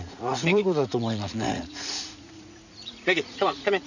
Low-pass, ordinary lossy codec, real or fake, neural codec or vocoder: 7.2 kHz; none; fake; vocoder, 44.1 kHz, 80 mel bands, Vocos